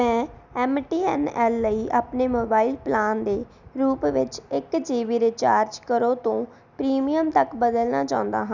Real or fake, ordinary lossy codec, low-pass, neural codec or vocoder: real; none; 7.2 kHz; none